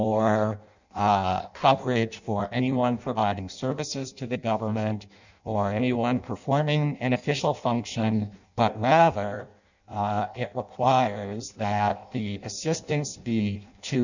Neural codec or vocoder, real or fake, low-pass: codec, 16 kHz in and 24 kHz out, 0.6 kbps, FireRedTTS-2 codec; fake; 7.2 kHz